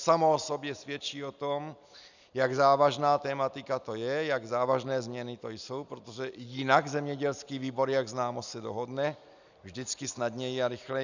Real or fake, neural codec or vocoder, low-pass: real; none; 7.2 kHz